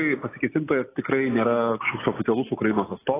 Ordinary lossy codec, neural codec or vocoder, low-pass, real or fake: AAC, 16 kbps; none; 3.6 kHz; real